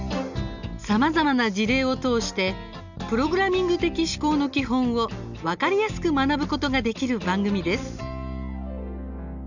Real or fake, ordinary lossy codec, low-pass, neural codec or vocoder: real; none; 7.2 kHz; none